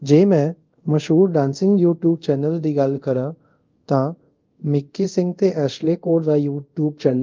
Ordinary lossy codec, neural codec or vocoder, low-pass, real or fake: Opus, 32 kbps; codec, 24 kHz, 0.5 kbps, DualCodec; 7.2 kHz; fake